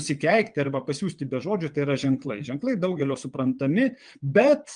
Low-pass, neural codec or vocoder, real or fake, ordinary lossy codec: 9.9 kHz; vocoder, 22.05 kHz, 80 mel bands, WaveNeXt; fake; Opus, 32 kbps